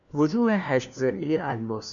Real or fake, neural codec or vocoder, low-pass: fake; codec, 16 kHz, 1 kbps, FunCodec, trained on LibriTTS, 50 frames a second; 7.2 kHz